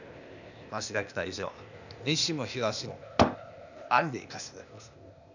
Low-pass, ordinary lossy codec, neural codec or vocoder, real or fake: 7.2 kHz; none; codec, 16 kHz, 0.8 kbps, ZipCodec; fake